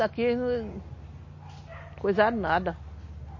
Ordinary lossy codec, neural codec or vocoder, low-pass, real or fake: MP3, 32 kbps; none; 7.2 kHz; real